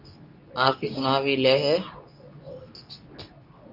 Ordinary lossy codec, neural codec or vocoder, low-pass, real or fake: Opus, 64 kbps; codec, 24 kHz, 0.9 kbps, WavTokenizer, medium speech release version 2; 5.4 kHz; fake